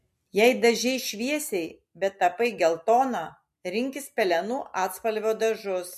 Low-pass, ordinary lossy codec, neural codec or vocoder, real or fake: 14.4 kHz; MP3, 64 kbps; none; real